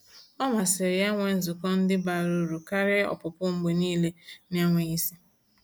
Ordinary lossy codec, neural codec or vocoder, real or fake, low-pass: none; none; real; none